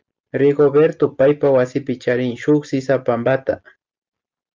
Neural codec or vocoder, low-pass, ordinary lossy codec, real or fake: none; 7.2 kHz; Opus, 24 kbps; real